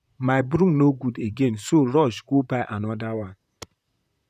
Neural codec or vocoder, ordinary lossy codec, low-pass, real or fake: vocoder, 44.1 kHz, 128 mel bands, Pupu-Vocoder; none; 14.4 kHz; fake